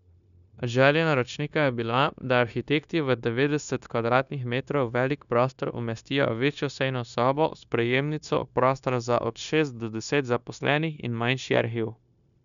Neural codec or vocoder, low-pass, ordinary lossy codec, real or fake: codec, 16 kHz, 0.9 kbps, LongCat-Audio-Codec; 7.2 kHz; none; fake